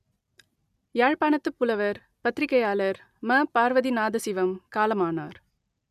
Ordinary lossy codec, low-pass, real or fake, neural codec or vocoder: none; 14.4 kHz; real; none